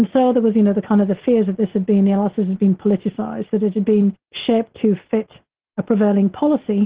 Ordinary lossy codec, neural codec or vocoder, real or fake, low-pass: Opus, 16 kbps; none; real; 3.6 kHz